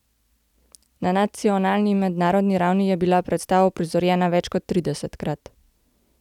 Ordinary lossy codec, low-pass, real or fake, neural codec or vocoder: none; 19.8 kHz; real; none